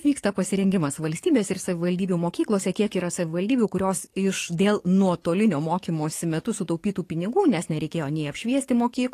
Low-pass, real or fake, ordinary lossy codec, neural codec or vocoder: 14.4 kHz; fake; AAC, 48 kbps; codec, 44.1 kHz, 7.8 kbps, DAC